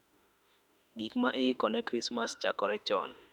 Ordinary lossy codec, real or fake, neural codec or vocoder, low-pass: none; fake; autoencoder, 48 kHz, 32 numbers a frame, DAC-VAE, trained on Japanese speech; 19.8 kHz